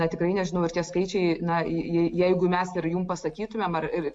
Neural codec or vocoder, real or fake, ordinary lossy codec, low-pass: none; real; AAC, 64 kbps; 9.9 kHz